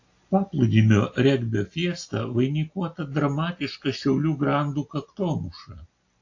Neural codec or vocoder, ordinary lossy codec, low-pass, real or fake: none; AAC, 48 kbps; 7.2 kHz; real